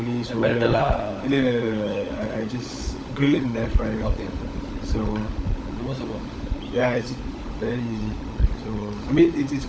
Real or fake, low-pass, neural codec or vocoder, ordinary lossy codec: fake; none; codec, 16 kHz, 16 kbps, FunCodec, trained on LibriTTS, 50 frames a second; none